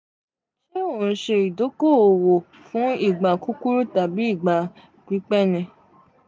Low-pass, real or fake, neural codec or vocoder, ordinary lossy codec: none; real; none; none